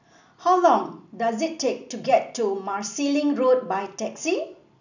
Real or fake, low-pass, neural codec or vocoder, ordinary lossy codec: real; 7.2 kHz; none; none